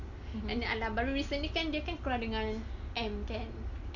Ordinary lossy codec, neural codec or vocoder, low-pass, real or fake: none; none; 7.2 kHz; real